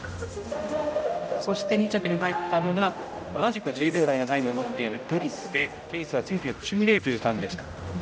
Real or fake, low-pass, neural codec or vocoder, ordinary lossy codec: fake; none; codec, 16 kHz, 0.5 kbps, X-Codec, HuBERT features, trained on general audio; none